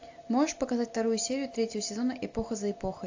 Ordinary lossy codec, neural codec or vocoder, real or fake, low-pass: AAC, 48 kbps; none; real; 7.2 kHz